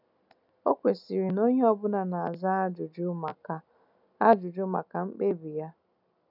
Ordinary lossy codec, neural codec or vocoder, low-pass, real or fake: none; none; 5.4 kHz; real